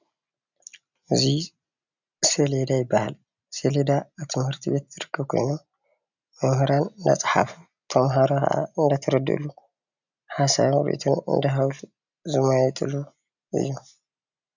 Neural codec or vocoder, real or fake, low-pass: none; real; 7.2 kHz